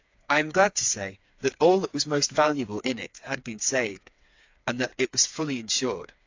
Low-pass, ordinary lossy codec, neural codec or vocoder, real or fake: 7.2 kHz; AAC, 48 kbps; codec, 16 kHz, 4 kbps, FreqCodec, smaller model; fake